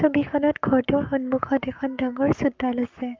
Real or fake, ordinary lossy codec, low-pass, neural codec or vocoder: fake; Opus, 24 kbps; 7.2 kHz; codec, 44.1 kHz, 7.8 kbps, Pupu-Codec